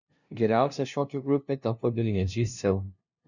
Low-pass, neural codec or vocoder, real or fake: 7.2 kHz; codec, 16 kHz, 0.5 kbps, FunCodec, trained on LibriTTS, 25 frames a second; fake